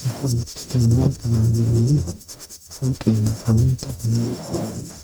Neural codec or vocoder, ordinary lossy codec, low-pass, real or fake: codec, 44.1 kHz, 0.9 kbps, DAC; MP3, 96 kbps; 19.8 kHz; fake